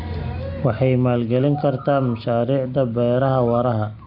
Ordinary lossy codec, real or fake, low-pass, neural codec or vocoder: none; real; 5.4 kHz; none